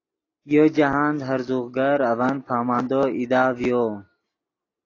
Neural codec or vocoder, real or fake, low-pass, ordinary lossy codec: none; real; 7.2 kHz; AAC, 32 kbps